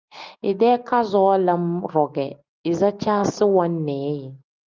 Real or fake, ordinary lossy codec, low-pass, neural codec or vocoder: real; Opus, 24 kbps; 7.2 kHz; none